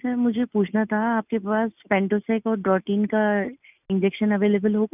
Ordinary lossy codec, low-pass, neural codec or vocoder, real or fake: none; 3.6 kHz; none; real